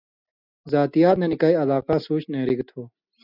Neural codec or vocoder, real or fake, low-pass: none; real; 5.4 kHz